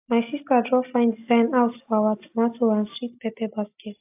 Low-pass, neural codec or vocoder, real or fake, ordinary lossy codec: 3.6 kHz; none; real; AAC, 32 kbps